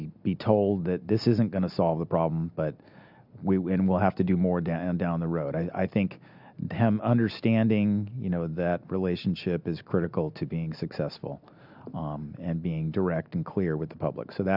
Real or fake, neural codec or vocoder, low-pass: real; none; 5.4 kHz